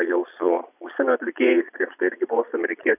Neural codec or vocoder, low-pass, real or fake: vocoder, 22.05 kHz, 80 mel bands, Vocos; 3.6 kHz; fake